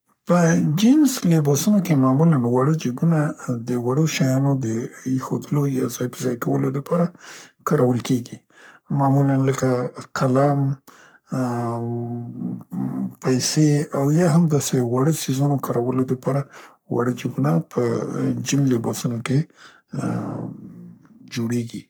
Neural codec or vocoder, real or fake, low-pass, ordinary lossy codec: codec, 44.1 kHz, 3.4 kbps, Pupu-Codec; fake; none; none